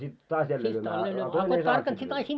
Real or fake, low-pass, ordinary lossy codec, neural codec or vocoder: real; none; none; none